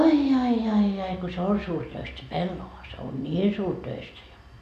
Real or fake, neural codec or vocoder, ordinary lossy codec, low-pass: real; none; none; 14.4 kHz